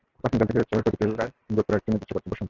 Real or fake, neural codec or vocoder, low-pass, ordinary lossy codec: real; none; 7.2 kHz; Opus, 24 kbps